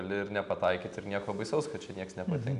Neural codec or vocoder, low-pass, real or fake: none; 10.8 kHz; real